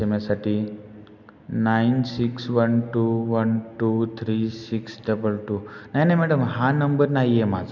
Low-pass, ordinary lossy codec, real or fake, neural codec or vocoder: 7.2 kHz; none; real; none